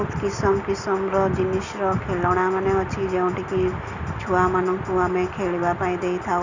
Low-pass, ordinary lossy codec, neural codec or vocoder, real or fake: 7.2 kHz; Opus, 64 kbps; none; real